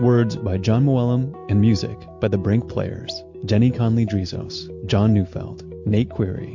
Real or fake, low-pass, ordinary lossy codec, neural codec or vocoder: real; 7.2 kHz; MP3, 48 kbps; none